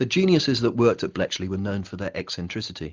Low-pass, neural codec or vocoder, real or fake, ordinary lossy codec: 7.2 kHz; none; real; Opus, 32 kbps